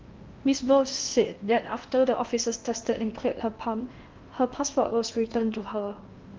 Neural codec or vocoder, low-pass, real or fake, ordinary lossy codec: codec, 16 kHz in and 24 kHz out, 0.8 kbps, FocalCodec, streaming, 65536 codes; 7.2 kHz; fake; Opus, 32 kbps